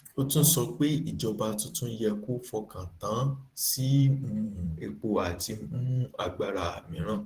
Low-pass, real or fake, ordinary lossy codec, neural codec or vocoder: 14.4 kHz; fake; Opus, 16 kbps; vocoder, 44.1 kHz, 128 mel bands every 512 samples, BigVGAN v2